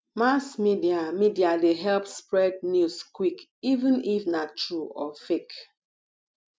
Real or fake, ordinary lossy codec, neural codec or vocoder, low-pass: real; none; none; none